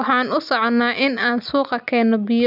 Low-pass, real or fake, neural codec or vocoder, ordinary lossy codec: 5.4 kHz; real; none; none